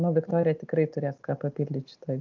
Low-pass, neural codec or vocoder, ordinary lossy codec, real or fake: 7.2 kHz; none; Opus, 32 kbps; real